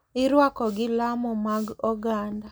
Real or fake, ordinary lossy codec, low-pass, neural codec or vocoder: real; none; none; none